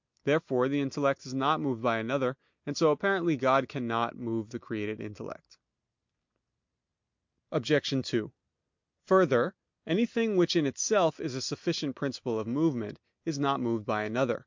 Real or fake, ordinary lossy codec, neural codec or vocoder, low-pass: real; MP3, 64 kbps; none; 7.2 kHz